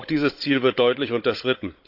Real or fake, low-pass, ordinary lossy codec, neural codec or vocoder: fake; 5.4 kHz; AAC, 48 kbps; codec, 16 kHz, 16 kbps, FunCodec, trained on Chinese and English, 50 frames a second